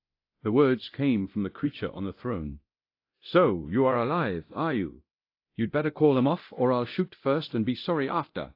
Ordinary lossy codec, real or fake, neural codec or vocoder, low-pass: AAC, 32 kbps; fake; codec, 24 kHz, 0.9 kbps, DualCodec; 5.4 kHz